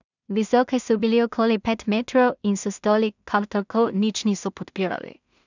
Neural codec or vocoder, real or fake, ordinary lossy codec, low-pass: codec, 16 kHz in and 24 kHz out, 0.4 kbps, LongCat-Audio-Codec, two codebook decoder; fake; none; 7.2 kHz